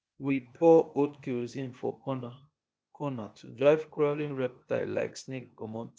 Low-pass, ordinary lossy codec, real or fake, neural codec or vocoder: none; none; fake; codec, 16 kHz, 0.8 kbps, ZipCodec